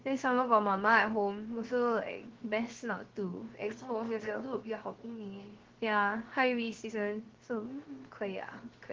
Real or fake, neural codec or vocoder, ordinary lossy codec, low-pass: fake; codec, 16 kHz, 0.7 kbps, FocalCodec; Opus, 16 kbps; 7.2 kHz